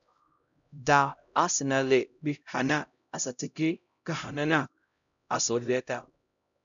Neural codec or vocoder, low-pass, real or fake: codec, 16 kHz, 0.5 kbps, X-Codec, HuBERT features, trained on LibriSpeech; 7.2 kHz; fake